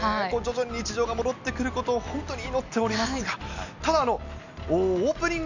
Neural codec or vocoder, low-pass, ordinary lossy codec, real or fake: none; 7.2 kHz; none; real